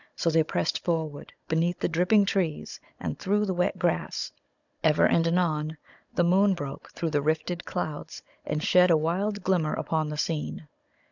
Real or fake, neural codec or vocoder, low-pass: fake; codec, 16 kHz, 16 kbps, FunCodec, trained on Chinese and English, 50 frames a second; 7.2 kHz